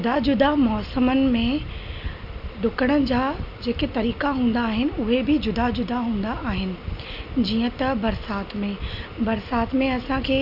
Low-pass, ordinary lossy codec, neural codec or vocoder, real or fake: 5.4 kHz; none; none; real